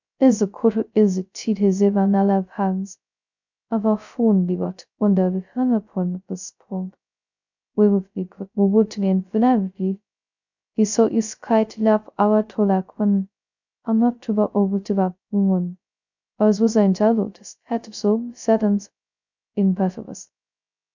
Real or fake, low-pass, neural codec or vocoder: fake; 7.2 kHz; codec, 16 kHz, 0.2 kbps, FocalCodec